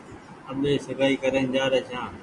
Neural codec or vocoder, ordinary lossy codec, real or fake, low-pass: none; MP3, 96 kbps; real; 10.8 kHz